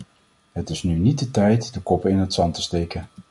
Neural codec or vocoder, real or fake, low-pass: none; real; 10.8 kHz